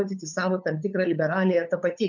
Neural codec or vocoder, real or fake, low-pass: codec, 16 kHz, 8 kbps, FunCodec, trained on LibriTTS, 25 frames a second; fake; 7.2 kHz